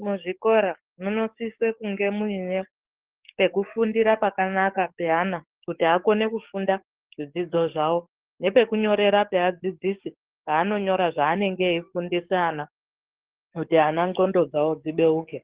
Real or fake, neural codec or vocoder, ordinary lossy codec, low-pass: fake; codec, 44.1 kHz, 7.8 kbps, DAC; Opus, 16 kbps; 3.6 kHz